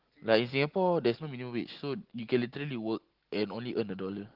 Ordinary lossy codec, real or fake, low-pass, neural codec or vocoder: Opus, 16 kbps; real; 5.4 kHz; none